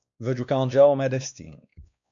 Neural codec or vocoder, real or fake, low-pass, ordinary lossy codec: codec, 16 kHz, 2 kbps, X-Codec, WavLM features, trained on Multilingual LibriSpeech; fake; 7.2 kHz; MP3, 64 kbps